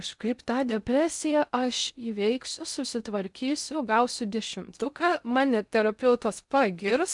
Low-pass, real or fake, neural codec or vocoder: 10.8 kHz; fake; codec, 16 kHz in and 24 kHz out, 0.6 kbps, FocalCodec, streaming, 2048 codes